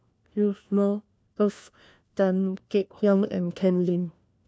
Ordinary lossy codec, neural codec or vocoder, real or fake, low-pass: none; codec, 16 kHz, 1 kbps, FunCodec, trained on LibriTTS, 50 frames a second; fake; none